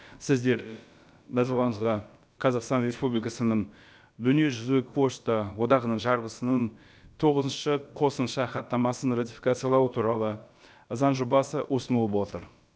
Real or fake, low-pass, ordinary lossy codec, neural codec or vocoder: fake; none; none; codec, 16 kHz, about 1 kbps, DyCAST, with the encoder's durations